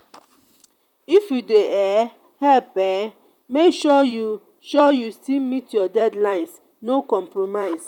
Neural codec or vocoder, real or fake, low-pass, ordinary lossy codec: vocoder, 44.1 kHz, 128 mel bands, Pupu-Vocoder; fake; 19.8 kHz; none